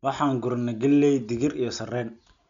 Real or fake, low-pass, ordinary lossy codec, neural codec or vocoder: real; 7.2 kHz; none; none